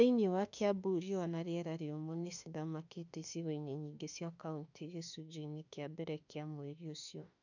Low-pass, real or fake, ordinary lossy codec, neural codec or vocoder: 7.2 kHz; fake; none; autoencoder, 48 kHz, 32 numbers a frame, DAC-VAE, trained on Japanese speech